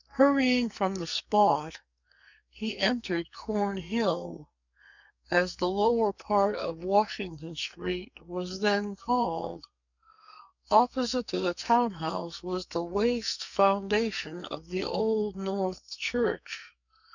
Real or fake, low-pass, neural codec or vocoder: fake; 7.2 kHz; codec, 44.1 kHz, 2.6 kbps, SNAC